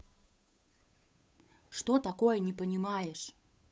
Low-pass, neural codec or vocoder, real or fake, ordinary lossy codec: none; codec, 16 kHz, 8 kbps, FunCodec, trained on Chinese and English, 25 frames a second; fake; none